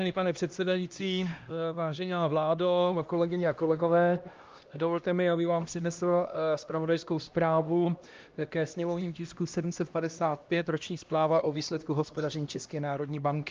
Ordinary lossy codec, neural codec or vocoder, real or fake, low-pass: Opus, 24 kbps; codec, 16 kHz, 1 kbps, X-Codec, HuBERT features, trained on LibriSpeech; fake; 7.2 kHz